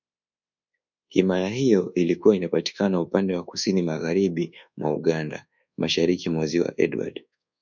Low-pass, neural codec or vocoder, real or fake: 7.2 kHz; codec, 24 kHz, 1.2 kbps, DualCodec; fake